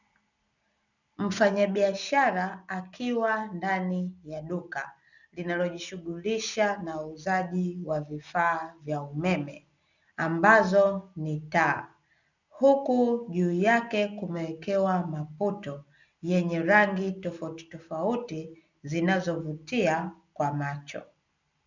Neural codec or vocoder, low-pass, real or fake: none; 7.2 kHz; real